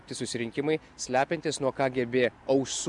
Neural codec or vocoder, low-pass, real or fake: none; 10.8 kHz; real